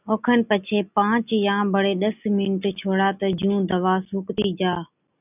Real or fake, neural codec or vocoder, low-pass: real; none; 3.6 kHz